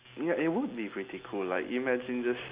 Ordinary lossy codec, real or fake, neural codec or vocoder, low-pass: Opus, 64 kbps; real; none; 3.6 kHz